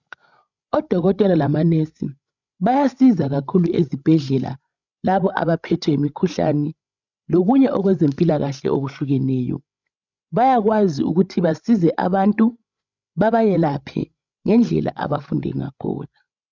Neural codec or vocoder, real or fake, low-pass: codec, 16 kHz, 16 kbps, FreqCodec, larger model; fake; 7.2 kHz